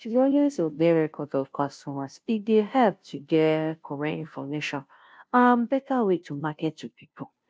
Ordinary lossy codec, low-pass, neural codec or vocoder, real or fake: none; none; codec, 16 kHz, 0.5 kbps, FunCodec, trained on Chinese and English, 25 frames a second; fake